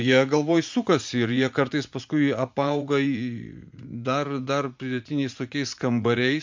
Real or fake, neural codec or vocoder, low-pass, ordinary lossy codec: fake; vocoder, 44.1 kHz, 80 mel bands, Vocos; 7.2 kHz; MP3, 64 kbps